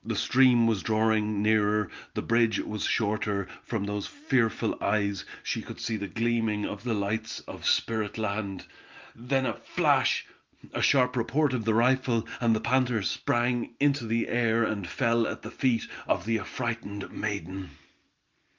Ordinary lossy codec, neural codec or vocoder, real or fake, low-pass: Opus, 24 kbps; none; real; 7.2 kHz